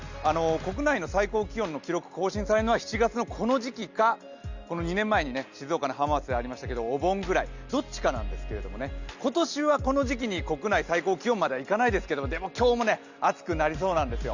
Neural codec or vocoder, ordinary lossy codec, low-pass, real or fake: none; Opus, 64 kbps; 7.2 kHz; real